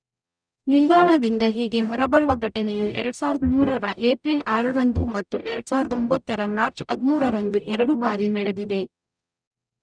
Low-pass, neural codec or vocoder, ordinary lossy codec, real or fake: 9.9 kHz; codec, 44.1 kHz, 0.9 kbps, DAC; none; fake